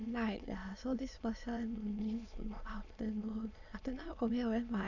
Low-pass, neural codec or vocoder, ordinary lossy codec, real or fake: 7.2 kHz; autoencoder, 22.05 kHz, a latent of 192 numbers a frame, VITS, trained on many speakers; none; fake